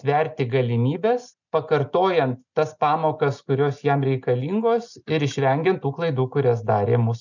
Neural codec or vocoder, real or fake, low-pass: none; real; 7.2 kHz